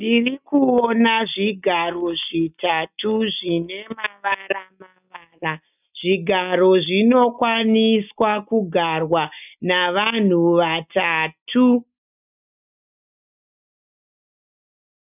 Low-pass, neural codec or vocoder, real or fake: 3.6 kHz; none; real